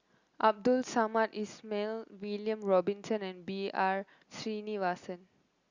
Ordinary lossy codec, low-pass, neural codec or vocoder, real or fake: Opus, 64 kbps; 7.2 kHz; none; real